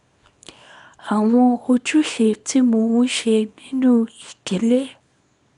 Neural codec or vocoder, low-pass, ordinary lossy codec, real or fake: codec, 24 kHz, 0.9 kbps, WavTokenizer, small release; 10.8 kHz; none; fake